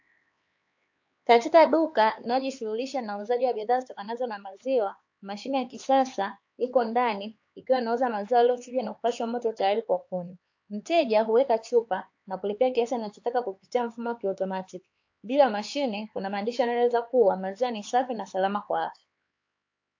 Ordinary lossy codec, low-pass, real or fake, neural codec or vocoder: AAC, 48 kbps; 7.2 kHz; fake; codec, 16 kHz, 4 kbps, X-Codec, HuBERT features, trained on LibriSpeech